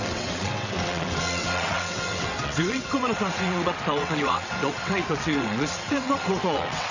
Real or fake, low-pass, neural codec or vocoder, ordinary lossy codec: fake; 7.2 kHz; vocoder, 22.05 kHz, 80 mel bands, WaveNeXt; none